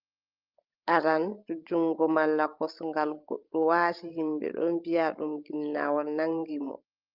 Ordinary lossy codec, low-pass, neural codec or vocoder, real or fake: Opus, 24 kbps; 5.4 kHz; codec, 16 kHz, 16 kbps, FreqCodec, larger model; fake